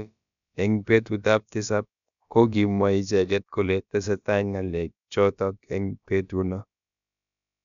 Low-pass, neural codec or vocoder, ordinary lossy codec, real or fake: 7.2 kHz; codec, 16 kHz, about 1 kbps, DyCAST, with the encoder's durations; none; fake